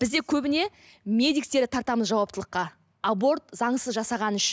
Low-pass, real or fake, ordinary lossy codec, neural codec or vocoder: none; real; none; none